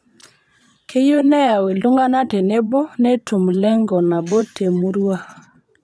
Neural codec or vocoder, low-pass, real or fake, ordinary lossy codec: vocoder, 22.05 kHz, 80 mel bands, Vocos; none; fake; none